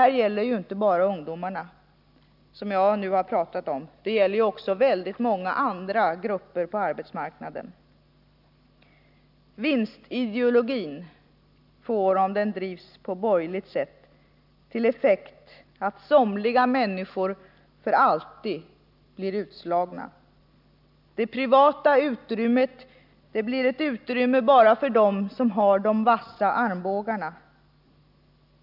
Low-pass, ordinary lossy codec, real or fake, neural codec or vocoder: 5.4 kHz; none; real; none